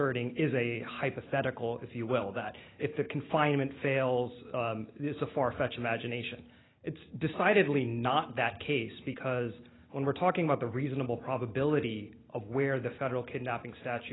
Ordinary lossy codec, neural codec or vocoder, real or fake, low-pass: AAC, 16 kbps; none; real; 7.2 kHz